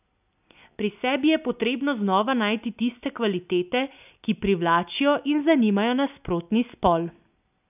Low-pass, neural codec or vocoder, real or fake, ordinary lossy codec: 3.6 kHz; none; real; none